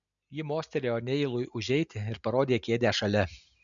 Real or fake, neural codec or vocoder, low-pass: real; none; 7.2 kHz